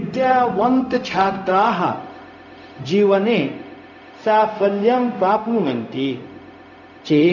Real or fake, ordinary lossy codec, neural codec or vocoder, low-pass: fake; none; codec, 16 kHz, 0.4 kbps, LongCat-Audio-Codec; 7.2 kHz